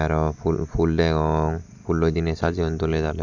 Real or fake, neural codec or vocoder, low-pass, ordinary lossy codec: real; none; 7.2 kHz; none